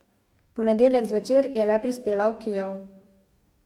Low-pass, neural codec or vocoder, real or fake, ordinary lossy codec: 19.8 kHz; codec, 44.1 kHz, 2.6 kbps, DAC; fake; MP3, 96 kbps